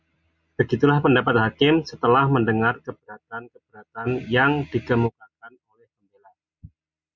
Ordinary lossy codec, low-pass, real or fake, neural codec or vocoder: Opus, 64 kbps; 7.2 kHz; real; none